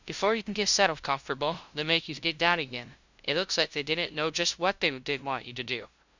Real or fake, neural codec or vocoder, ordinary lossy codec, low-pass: fake; codec, 16 kHz, 0.5 kbps, FunCodec, trained on LibriTTS, 25 frames a second; Opus, 64 kbps; 7.2 kHz